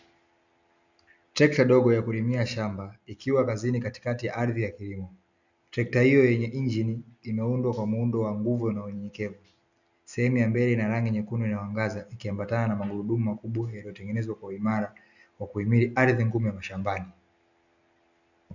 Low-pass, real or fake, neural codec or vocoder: 7.2 kHz; real; none